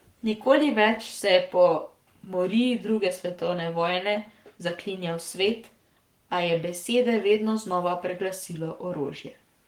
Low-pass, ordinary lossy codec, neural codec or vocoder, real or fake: 19.8 kHz; Opus, 24 kbps; codec, 44.1 kHz, 7.8 kbps, Pupu-Codec; fake